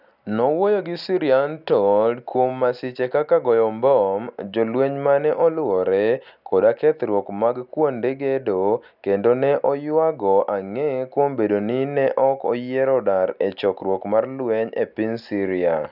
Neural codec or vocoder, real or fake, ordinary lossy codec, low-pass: none; real; none; 5.4 kHz